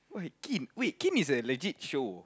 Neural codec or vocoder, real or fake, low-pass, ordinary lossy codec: none; real; none; none